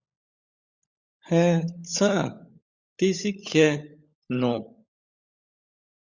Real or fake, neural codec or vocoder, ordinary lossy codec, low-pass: fake; codec, 16 kHz, 16 kbps, FunCodec, trained on LibriTTS, 50 frames a second; Opus, 64 kbps; 7.2 kHz